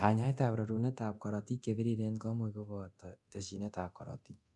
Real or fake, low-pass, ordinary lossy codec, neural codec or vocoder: fake; 10.8 kHz; MP3, 96 kbps; codec, 24 kHz, 0.9 kbps, DualCodec